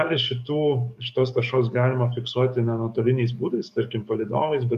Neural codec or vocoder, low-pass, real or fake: codec, 44.1 kHz, 7.8 kbps, DAC; 14.4 kHz; fake